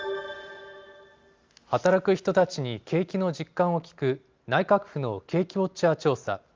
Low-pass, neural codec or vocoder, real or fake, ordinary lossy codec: 7.2 kHz; none; real; Opus, 32 kbps